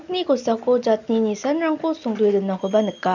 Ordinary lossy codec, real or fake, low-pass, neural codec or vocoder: none; real; 7.2 kHz; none